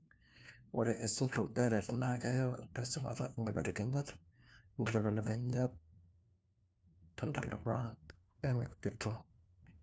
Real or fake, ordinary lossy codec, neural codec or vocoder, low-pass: fake; none; codec, 16 kHz, 1 kbps, FunCodec, trained on LibriTTS, 50 frames a second; none